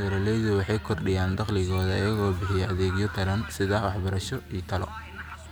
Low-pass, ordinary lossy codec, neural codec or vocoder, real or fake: none; none; none; real